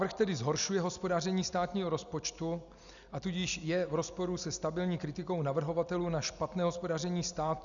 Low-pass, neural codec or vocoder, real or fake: 7.2 kHz; none; real